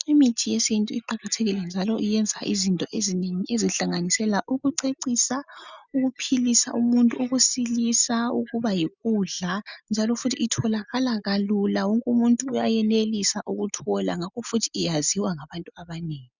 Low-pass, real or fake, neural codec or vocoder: 7.2 kHz; real; none